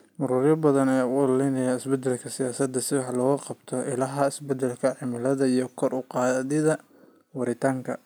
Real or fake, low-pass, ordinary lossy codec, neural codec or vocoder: real; none; none; none